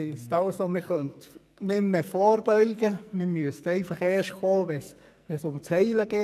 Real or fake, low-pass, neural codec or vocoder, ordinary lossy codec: fake; 14.4 kHz; codec, 44.1 kHz, 2.6 kbps, SNAC; none